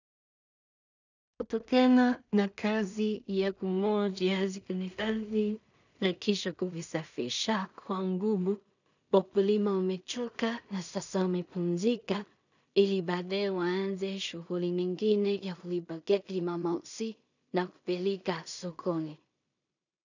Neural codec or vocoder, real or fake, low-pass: codec, 16 kHz in and 24 kHz out, 0.4 kbps, LongCat-Audio-Codec, two codebook decoder; fake; 7.2 kHz